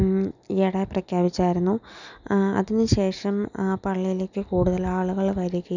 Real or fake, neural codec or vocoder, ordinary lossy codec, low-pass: real; none; none; 7.2 kHz